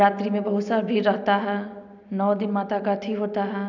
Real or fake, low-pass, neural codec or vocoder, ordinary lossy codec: real; 7.2 kHz; none; none